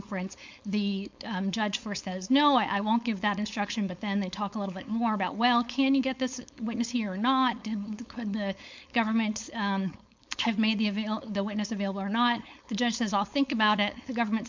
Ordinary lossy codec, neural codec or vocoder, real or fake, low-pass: MP3, 64 kbps; codec, 16 kHz, 4.8 kbps, FACodec; fake; 7.2 kHz